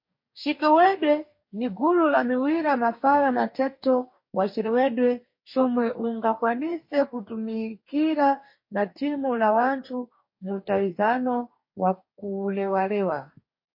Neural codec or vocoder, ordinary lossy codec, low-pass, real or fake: codec, 44.1 kHz, 2.6 kbps, DAC; MP3, 32 kbps; 5.4 kHz; fake